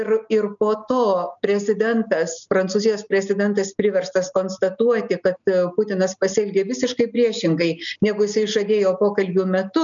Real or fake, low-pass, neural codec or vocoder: real; 7.2 kHz; none